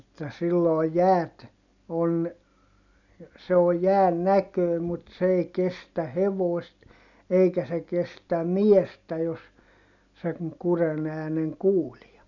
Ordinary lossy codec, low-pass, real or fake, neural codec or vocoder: none; 7.2 kHz; real; none